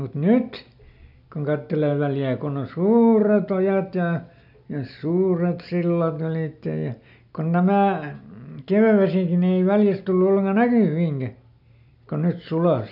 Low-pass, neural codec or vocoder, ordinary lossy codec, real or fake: 5.4 kHz; none; none; real